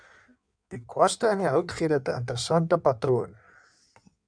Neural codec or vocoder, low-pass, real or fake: codec, 16 kHz in and 24 kHz out, 1.1 kbps, FireRedTTS-2 codec; 9.9 kHz; fake